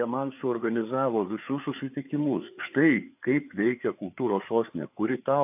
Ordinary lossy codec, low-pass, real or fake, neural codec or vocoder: AAC, 32 kbps; 3.6 kHz; fake; codec, 16 kHz, 4 kbps, FreqCodec, larger model